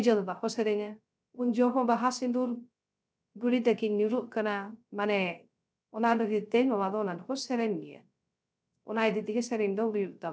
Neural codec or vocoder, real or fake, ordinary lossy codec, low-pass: codec, 16 kHz, 0.3 kbps, FocalCodec; fake; none; none